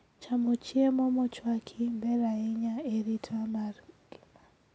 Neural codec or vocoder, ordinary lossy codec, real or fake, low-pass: none; none; real; none